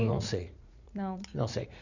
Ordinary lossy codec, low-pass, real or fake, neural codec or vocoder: none; 7.2 kHz; fake; vocoder, 44.1 kHz, 80 mel bands, Vocos